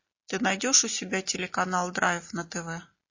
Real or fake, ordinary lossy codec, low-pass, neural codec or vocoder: real; MP3, 32 kbps; 7.2 kHz; none